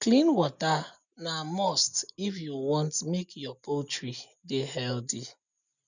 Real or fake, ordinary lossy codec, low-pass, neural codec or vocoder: real; none; 7.2 kHz; none